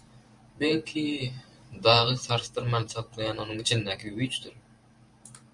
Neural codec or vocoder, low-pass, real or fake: vocoder, 44.1 kHz, 128 mel bands every 512 samples, BigVGAN v2; 10.8 kHz; fake